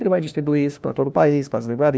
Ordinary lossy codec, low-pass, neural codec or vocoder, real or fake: none; none; codec, 16 kHz, 1 kbps, FunCodec, trained on LibriTTS, 50 frames a second; fake